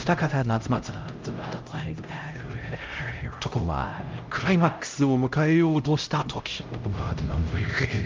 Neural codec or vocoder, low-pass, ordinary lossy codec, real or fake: codec, 16 kHz, 0.5 kbps, X-Codec, HuBERT features, trained on LibriSpeech; 7.2 kHz; Opus, 24 kbps; fake